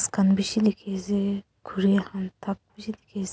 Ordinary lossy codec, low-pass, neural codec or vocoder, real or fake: none; none; none; real